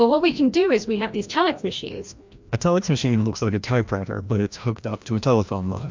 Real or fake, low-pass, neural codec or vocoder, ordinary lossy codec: fake; 7.2 kHz; codec, 16 kHz, 1 kbps, FreqCodec, larger model; MP3, 64 kbps